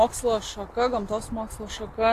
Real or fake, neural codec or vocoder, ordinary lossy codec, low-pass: real; none; AAC, 48 kbps; 14.4 kHz